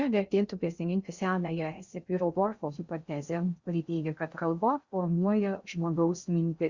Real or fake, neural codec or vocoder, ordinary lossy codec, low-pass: fake; codec, 16 kHz in and 24 kHz out, 0.6 kbps, FocalCodec, streaming, 2048 codes; Opus, 64 kbps; 7.2 kHz